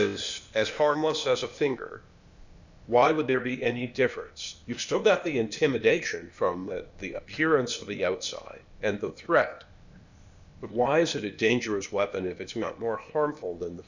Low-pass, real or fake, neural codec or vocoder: 7.2 kHz; fake; codec, 16 kHz, 0.8 kbps, ZipCodec